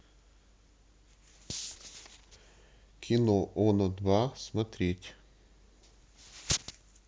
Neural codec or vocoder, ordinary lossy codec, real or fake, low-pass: none; none; real; none